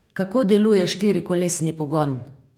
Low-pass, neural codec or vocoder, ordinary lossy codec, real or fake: 19.8 kHz; codec, 44.1 kHz, 2.6 kbps, DAC; none; fake